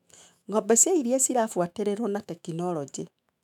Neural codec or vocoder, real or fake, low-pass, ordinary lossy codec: autoencoder, 48 kHz, 128 numbers a frame, DAC-VAE, trained on Japanese speech; fake; 19.8 kHz; none